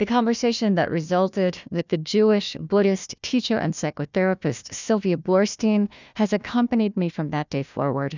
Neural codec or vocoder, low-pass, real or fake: codec, 16 kHz, 1 kbps, FunCodec, trained on Chinese and English, 50 frames a second; 7.2 kHz; fake